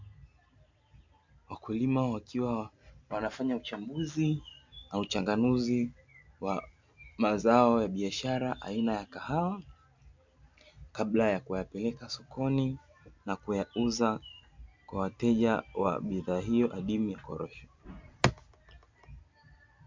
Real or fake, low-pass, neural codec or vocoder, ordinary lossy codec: real; 7.2 kHz; none; MP3, 64 kbps